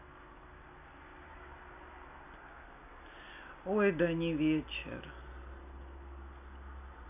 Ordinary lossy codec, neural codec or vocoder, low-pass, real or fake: none; none; 3.6 kHz; real